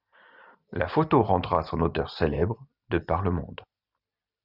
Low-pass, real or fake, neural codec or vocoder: 5.4 kHz; real; none